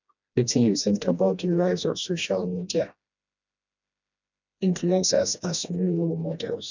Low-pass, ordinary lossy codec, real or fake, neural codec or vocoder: 7.2 kHz; none; fake; codec, 16 kHz, 1 kbps, FreqCodec, smaller model